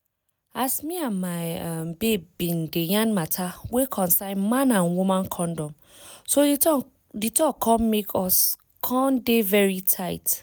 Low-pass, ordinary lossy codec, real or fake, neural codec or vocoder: none; none; real; none